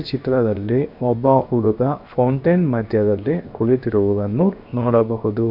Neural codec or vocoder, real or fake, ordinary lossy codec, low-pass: codec, 16 kHz, 0.7 kbps, FocalCodec; fake; none; 5.4 kHz